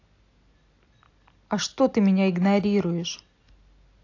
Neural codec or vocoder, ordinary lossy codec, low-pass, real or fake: none; AAC, 48 kbps; 7.2 kHz; real